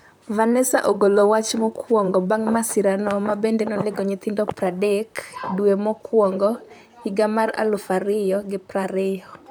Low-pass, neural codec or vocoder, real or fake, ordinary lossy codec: none; vocoder, 44.1 kHz, 128 mel bands, Pupu-Vocoder; fake; none